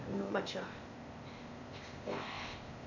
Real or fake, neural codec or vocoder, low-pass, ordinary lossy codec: fake; codec, 16 kHz, 0.8 kbps, ZipCodec; 7.2 kHz; none